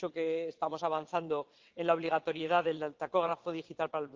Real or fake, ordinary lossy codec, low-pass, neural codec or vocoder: fake; Opus, 32 kbps; 7.2 kHz; vocoder, 22.05 kHz, 80 mel bands, WaveNeXt